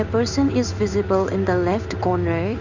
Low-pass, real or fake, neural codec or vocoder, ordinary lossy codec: 7.2 kHz; fake; codec, 16 kHz in and 24 kHz out, 1 kbps, XY-Tokenizer; none